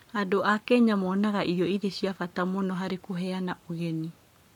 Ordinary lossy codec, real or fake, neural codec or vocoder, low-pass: none; fake; codec, 44.1 kHz, 7.8 kbps, Pupu-Codec; 19.8 kHz